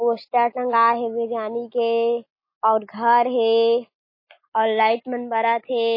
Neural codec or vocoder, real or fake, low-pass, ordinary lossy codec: none; real; 5.4 kHz; MP3, 24 kbps